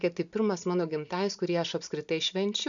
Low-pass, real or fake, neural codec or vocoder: 7.2 kHz; real; none